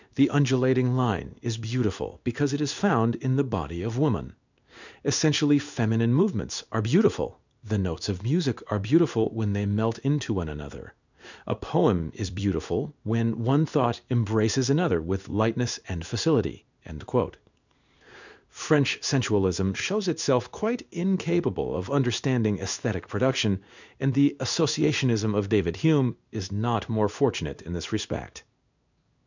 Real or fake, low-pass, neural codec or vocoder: fake; 7.2 kHz; codec, 16 kHz in and 24 kHz out, 1 kbps, XY-Tokenizer